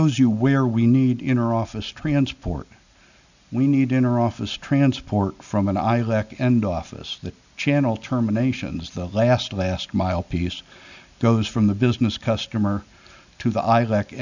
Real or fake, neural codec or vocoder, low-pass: real; none; 7.2 kHz